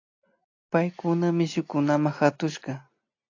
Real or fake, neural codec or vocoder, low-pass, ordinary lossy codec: real; none; 7.2 kHz; AAC, 48 kbps